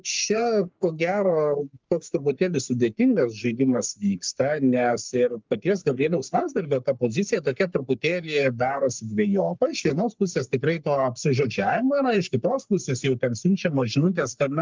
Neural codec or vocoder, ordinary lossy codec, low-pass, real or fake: codec, 44.1 kHz, 3.4 kbps, Pupu-Codec; Opus, 24 kbps; 7.2 kHz; fake